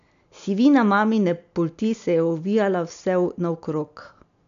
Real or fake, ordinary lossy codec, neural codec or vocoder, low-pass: real; none; none; 7.2 kHz